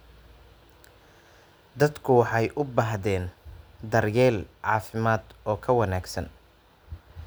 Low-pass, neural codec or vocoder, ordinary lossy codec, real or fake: none; none; none; real